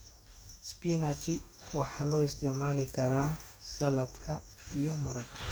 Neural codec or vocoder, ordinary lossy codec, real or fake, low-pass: codec, 44.1 kHz, 2.6 kbps, DAC; none; fake; none